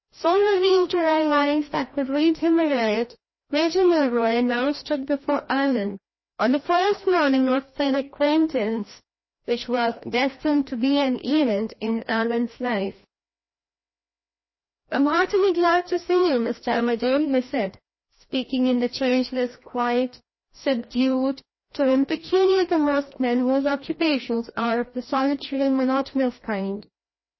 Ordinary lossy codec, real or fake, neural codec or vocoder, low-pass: MP3, 24 kbps; fake; codec, 16 kHz, 1 kbps, FreqCodec, larger model; 7.2 kHz